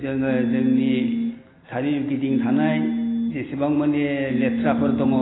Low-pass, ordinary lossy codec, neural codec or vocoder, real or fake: 7.2 kHz; AAC, 16 kbps; none; real